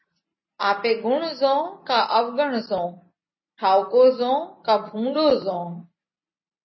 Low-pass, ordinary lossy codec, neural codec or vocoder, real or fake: 7.2 kHz; MP3, 24 kbps; none; real